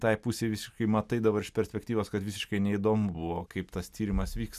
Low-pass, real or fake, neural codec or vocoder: 14.4 kHz; real; none